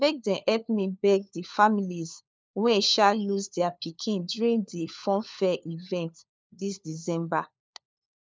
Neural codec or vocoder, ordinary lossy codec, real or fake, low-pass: codec, 16 kHz, 4 kbps, FunCodec, trained on LibriTTS, 50 frames a second; none; fake; none